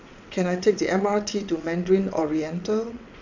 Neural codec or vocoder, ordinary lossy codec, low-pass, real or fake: vocoder, 22.05 kHz, 80 mel bands, WaveNeXt; none; 7.2 kHz; fake